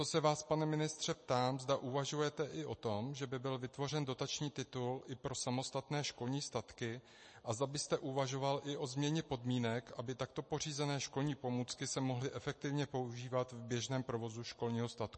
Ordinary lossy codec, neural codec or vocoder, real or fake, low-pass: MP3, 32 kbps; none; real; 10.8 kHz